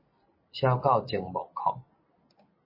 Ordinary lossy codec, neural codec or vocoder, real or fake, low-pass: MP3, 24 kbps; none; real; 5.4 kHz